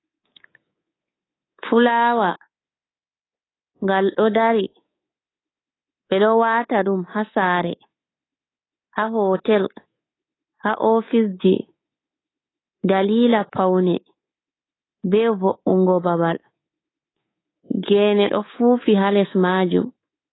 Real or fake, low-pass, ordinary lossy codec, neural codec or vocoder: fake; 7.2 kHz; AAC, 16 kbps; codec, 24 kHz, 3.1 kbps, DualCodec